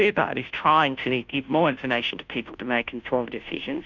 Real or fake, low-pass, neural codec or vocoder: fake; 7.2 kHz; codec, 16 kHz, 0.5 kbps, FunCodec, trained on Chinese and English, 25 frames a second